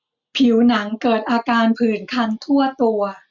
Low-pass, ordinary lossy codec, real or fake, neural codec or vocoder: 7.2 kHz; none; real; none